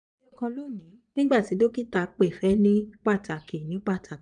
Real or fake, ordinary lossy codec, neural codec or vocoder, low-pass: fake; none; vocoder, 22.05 kHz, 80 mel bands, Vocos; 9.9 kHz